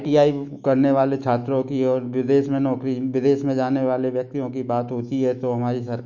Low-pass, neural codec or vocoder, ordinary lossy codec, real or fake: 7.2 kHz; autoencoder, 48 kHz, 128 numbers a frame, DAC-VAE, trained on Japanese speech; none; fake